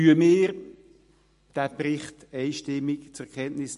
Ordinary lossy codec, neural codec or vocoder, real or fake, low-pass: MP3, 48 kbps; none; real; 14.4 kHz